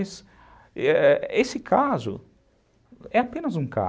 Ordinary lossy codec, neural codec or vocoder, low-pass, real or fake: none; none; none; real